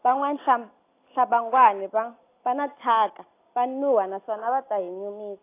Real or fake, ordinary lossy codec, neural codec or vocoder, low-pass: real; AAC, 24 kbps; none; 3.6 kHz